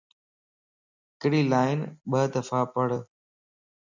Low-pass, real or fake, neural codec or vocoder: 7.2 kHz; real; none